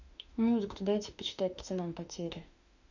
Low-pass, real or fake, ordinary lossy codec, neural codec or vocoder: 7.2 kHz; fake; none; autoencoder, 48 kHz, 32 numbers a frame, DAC-VAE, trained on Japanese speech